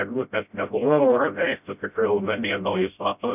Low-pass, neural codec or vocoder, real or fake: 3.6 kHz; codec, 16 kHz, 0.5 kbps, FreqCodec, smaller model; fake